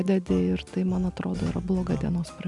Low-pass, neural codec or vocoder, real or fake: 10.8 kHz; none; real